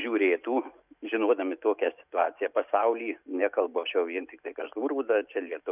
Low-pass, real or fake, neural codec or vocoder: 3.6 kHz; real; none